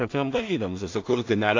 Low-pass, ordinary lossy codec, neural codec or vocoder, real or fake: 7.2 kHz; AAC, 48 kbps; codec, 16 kHz in and 24 kHz out, 0.4 kbps, LongCat-Audio-Codec, two codebook decoder; fake